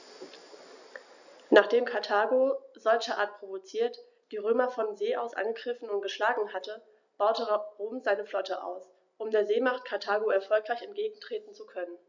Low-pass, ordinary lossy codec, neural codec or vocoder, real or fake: 7.2 kHz; none; none; real